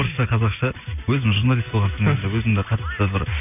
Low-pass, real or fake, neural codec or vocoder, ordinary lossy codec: 3.6 kHz; real; none; none